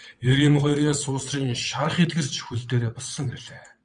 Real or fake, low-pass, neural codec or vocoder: fake; 9.9 kHz; vocoder, 22.05 kHz, 80 mel bands, WaveNeXt